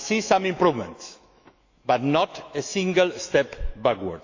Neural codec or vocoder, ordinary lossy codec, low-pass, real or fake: autoencoder, 48 kHz, 128 numbers a frame, DAC-VAE, trained on Japanese speech; AAC, 48 kbps; 7.2 kHz; fake